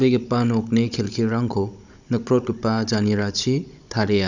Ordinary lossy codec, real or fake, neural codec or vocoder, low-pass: none; fake; codec, 16 kHz, 16 kbps, FunCodec, trained on Chinese and English, 50 frames a second; 7.2 kHz